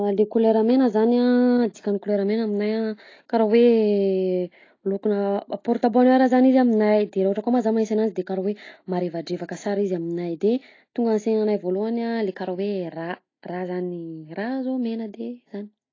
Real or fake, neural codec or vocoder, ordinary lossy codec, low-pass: real; none; AAC, 32 kbps; 7.2 kHz